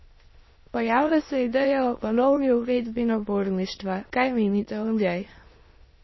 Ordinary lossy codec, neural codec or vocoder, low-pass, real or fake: MP3, 24 kbps; autoencoder, 22.05 kHz, a latent of 192 numbers a frame, VITS, trained on many speakers; 7.2 kHz; fake